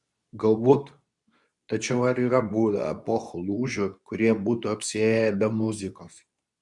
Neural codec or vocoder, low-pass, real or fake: codec, 24 kHz, 0.9 kbps, WavTokenizer, medium speech release version 2; 10.8 kHz; fake